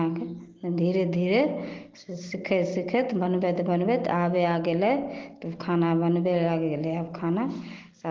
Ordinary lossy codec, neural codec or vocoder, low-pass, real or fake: Opus, 16 kbps; none; 7.2 kHz; real